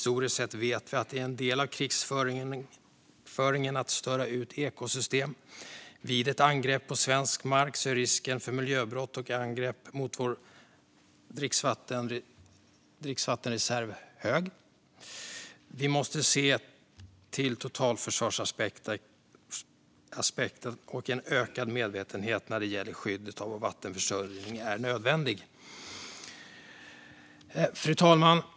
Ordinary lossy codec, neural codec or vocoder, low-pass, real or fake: none; none; none; real